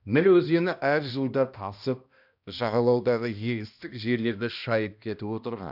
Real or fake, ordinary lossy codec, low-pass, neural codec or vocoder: fake; none; 5.4 kHz; codec, 16 kHz, 1 kbps, X-Codec, HuBERT features, trained on balanced general audio